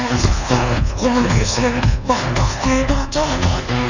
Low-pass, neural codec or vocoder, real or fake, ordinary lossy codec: 7.2 kHz; codec, 24 kHz, 1.2 kbps, DualCodec; fake; none